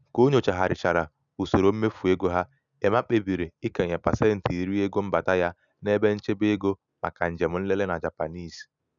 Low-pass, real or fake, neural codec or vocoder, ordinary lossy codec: 7.2 kHz; real; none; none